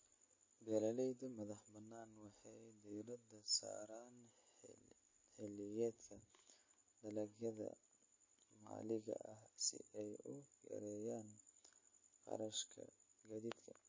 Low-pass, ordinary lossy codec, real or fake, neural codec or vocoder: 7.2 kHz; MP3, 32 kbps; real; none